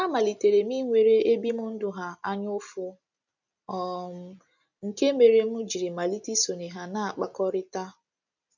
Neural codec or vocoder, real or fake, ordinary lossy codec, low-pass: none; real; none; 7.2 kHz